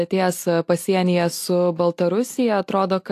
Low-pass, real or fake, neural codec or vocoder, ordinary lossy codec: 14.4 kHz; real; none; AAC, 64 kbps